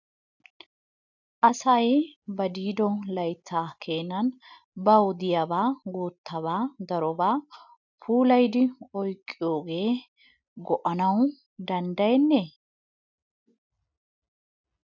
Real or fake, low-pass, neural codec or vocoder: real; 7.2 kHz; none